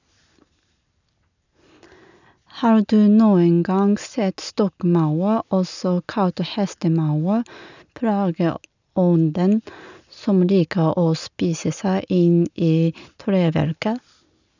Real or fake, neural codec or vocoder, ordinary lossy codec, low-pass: real; none; none; 7.2 kHz